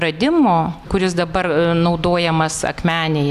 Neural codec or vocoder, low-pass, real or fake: none; 14.4 kHz; real